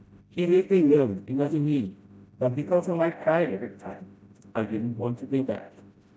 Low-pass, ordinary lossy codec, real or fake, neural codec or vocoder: none; none; fake; codec, 16 kHz, 0.5 kbps, FreqCodec, smaller model